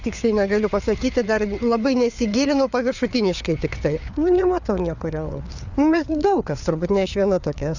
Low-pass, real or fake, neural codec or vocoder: 7.2 kHz; fake; codec, 16 kHz, 4 kbps, FreqCodec, larger model